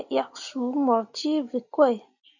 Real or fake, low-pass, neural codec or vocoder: real; 7.2 kHz; none